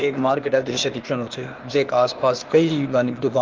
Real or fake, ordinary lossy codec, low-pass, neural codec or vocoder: fake; Opus, 24 kbps; 7.2 kHz; codec, 16 kHz, 0.8 kbps, ZipCodec